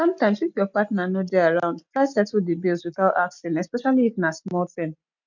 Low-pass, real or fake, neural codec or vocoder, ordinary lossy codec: 7.2 kHz; real; none; none